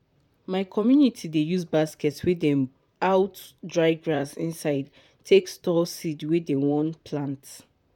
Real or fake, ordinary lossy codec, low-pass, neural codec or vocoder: fake; none; 19.8 kHz; vocoder, 44.1 kHz, 128 mel bands, Pupu-Vocoder